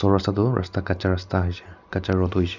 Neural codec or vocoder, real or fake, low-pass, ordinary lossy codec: none; real; 7.2 kHz; none